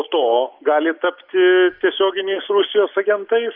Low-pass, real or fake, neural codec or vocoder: 5.4 kHz; real; none